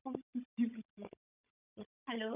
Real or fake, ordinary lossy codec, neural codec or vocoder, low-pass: fake; none; codec, 16 kHz, 16 kbps, FunCodec, trained on Chinese and English, 50 frames a second; 3.6 kHz